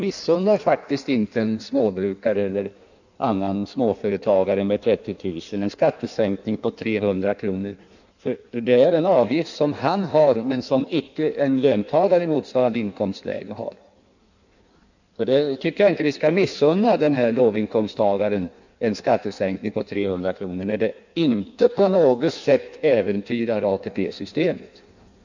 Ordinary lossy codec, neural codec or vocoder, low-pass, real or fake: none; codec, 16 kHz in and 24 kHz out, 1.1 kbps, FireRedTTS-2 codec; 7.2 kHz; fake